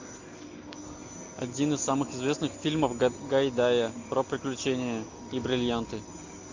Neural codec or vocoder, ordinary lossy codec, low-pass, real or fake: none; MP3, 48 kbps; 7.2 kHz; real